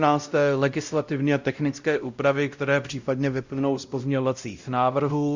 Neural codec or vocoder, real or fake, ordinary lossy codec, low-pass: codec, 16 kHz, 0.5 kbps, X-Codec, WavLM features, trained on Multilingual LibriSpeech; fake; Opus, 64 kbps; 7.2 kHz